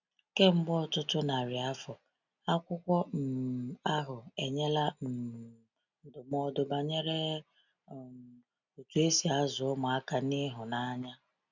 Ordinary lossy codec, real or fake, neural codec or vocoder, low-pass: none; real; none; 7.2 kHz